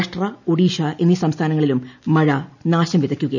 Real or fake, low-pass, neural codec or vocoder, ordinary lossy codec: real; 7.2 kHz; none; none